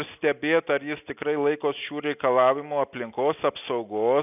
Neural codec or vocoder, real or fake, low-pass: none; real; 3.6 kHz